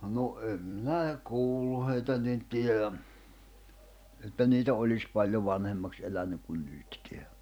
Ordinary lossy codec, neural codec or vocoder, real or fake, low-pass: none; vocoder, 44.1 kHz, 128 mel bands every 256 samples, BigVGAN v2; fake; none